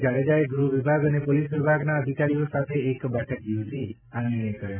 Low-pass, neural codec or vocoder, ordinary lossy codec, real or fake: 3.6 kHz; none; none; real